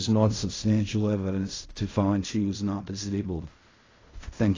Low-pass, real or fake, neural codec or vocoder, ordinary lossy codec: 7.2 kHz; fake; codec, 16 kHz in and 24 kHz out, 0.4 kbps, LongCat-Audio-Codec, fine tuned four codebook decoder; AAC, 32 kbps